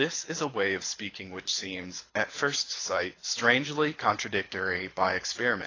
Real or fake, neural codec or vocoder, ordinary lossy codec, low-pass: fake; codec, 24 kHz, 6 kbps, HILCodec; AAC, 32 kbps; 7.2 kHz